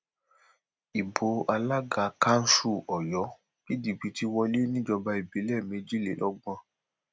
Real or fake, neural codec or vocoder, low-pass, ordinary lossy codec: real; none; none; none